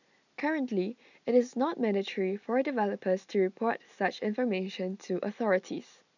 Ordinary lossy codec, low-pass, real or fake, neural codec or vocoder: none; 7.2 kHz; real; none